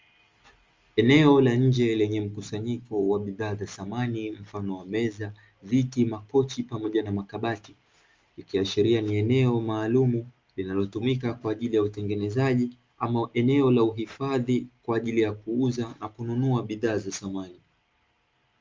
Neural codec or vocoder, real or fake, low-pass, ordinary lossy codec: none; real; 7.2 kHz; Opus, 32 kbps